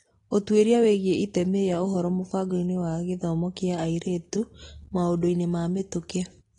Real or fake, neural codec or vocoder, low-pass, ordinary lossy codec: real; none; 10.8 kHz; AAC, 32 kbps